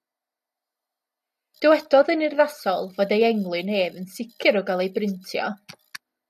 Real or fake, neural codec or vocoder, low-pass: real; none; 14.4 kHz